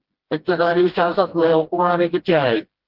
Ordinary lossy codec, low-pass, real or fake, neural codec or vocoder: Opus, 16 kbps; 5.4 kHz; fake; codec, 16 kHz, 1 kbps, FreqCodec, smaller model